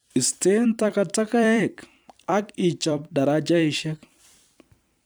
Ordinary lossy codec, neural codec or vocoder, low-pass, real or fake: none; vocoder, 44.1 kHz, 128 mel bands every 256 samples, BigVGAN v2; none; fake